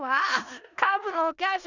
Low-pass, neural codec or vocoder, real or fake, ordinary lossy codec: 7.2 kHz; codec, 16 kHz in and 24 kHz out, 0.4 kbps, LongCat-Audio-Codec, four codebook decoder; fake; none